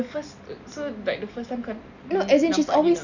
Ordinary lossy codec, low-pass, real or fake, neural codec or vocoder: none; 7.2 kHz; real; none